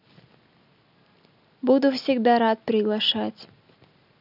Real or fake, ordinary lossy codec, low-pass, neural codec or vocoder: real; none; 5.4 kHz; none